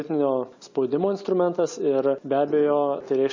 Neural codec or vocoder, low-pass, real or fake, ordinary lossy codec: none; 7.2 kHz; real; MP3, 48 kbps